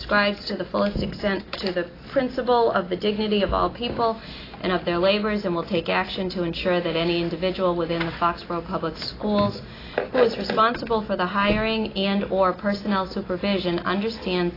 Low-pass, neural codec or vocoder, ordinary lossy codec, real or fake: 5.4 kHz; none; AAC, 24 kbps; real